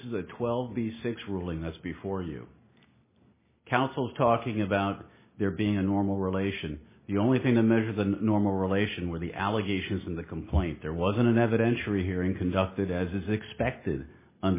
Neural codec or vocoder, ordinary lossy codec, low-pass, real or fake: none; MP3, 16 kbps; 3.6 kHz; real